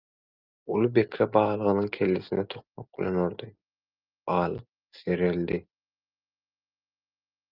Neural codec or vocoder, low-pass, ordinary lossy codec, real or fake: none; 5.4 kHz; Opus, 24 kbps; real